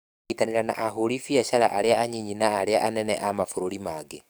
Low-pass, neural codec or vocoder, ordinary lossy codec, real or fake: none; codec, 44.1 kHz, 7.8 kbps, DAC; none; fake